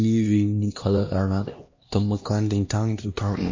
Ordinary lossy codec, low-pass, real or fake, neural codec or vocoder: MP3, 32 kbps; 7.2 kHz; fake; codec, 16 kHz, 1 kbps, X-Codec, HuBERT features, trained on LibriSpeech